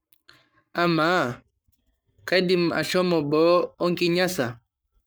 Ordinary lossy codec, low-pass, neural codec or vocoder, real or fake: none; none; codec, 44.1 kHz, 7.8 kbps, Pupu-Codec; fake